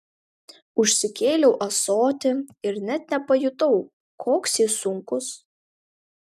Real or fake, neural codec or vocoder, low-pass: real; none; 14.4 kHz